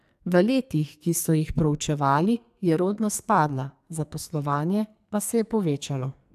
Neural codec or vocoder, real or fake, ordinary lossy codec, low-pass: codec, 44.1 kHz, 2.6 kbps, SNAC; fake; AAC, 96 kbps; 14.4 kHz